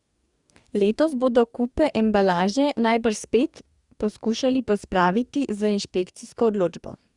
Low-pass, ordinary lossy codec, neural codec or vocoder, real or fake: 10.8 kHz; Opus, 64 kbps; codec, 44.1 kHz, 2.6 kbps, DAC; fake